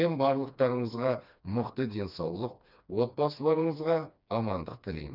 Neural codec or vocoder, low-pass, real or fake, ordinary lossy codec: codec, 16 kHz, 2 kbps, FreqCodec, smaller model; 5.4 kHz; fake; none